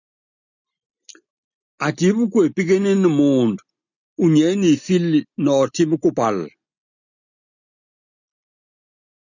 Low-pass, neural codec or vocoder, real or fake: 7.2 kHz; none; real